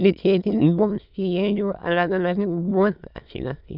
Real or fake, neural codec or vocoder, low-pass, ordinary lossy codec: fake; autoencoder, 22.05 kHz, a latent of 192 numbers a frame, VITS, trained on many speakers; 5.4 kHz; none